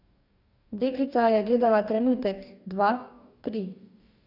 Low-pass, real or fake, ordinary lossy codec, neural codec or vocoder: 5.4 kHz; fake; none; codec, 44.1 kHz, 2.6 kbps, DAC